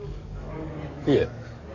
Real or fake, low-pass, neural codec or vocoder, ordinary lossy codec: fake; 7.2 kHz; codec, 44.1 kHz, 7.8 kbps, DAC; none